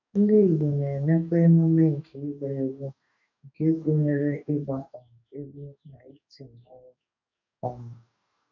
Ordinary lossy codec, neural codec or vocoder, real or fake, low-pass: none; codec, 44.1 kHz, 2.6 kbps, DAC; fake; 7.2 kHz